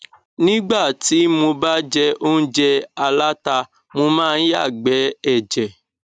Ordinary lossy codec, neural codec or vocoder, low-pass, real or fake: none; none; 9.9 kHz; real